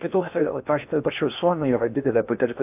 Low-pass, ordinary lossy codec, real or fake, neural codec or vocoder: 3.6 kHz; AAC, 32 kbps; fake; codec, 16 kHz in and 24 kHz out, 0.8 kbps, FocalCodec, streaming, 65536 codes